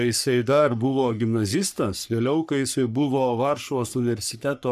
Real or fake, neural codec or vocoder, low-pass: fake; codec, 44.1 kHz, 3.4 kbps, Pupu-Codec; 14.4 kHz